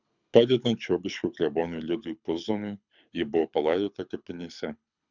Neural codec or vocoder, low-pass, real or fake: codec, 24 kHz, 6 kbps, HILCodec; 7.2 kHz; fake